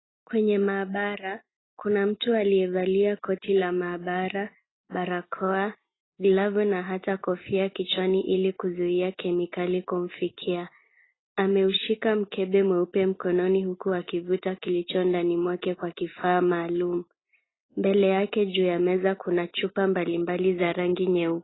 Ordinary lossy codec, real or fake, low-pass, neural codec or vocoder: AAC, 16 kbps; real; 7.2 kHz; none